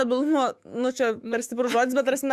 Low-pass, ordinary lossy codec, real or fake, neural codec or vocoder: 14.4 kHz; Opus, 64 kbps; fake; autoencoder, 48 kHz, 128 numbers a frame, DAC-VAE, trained on Japanese speech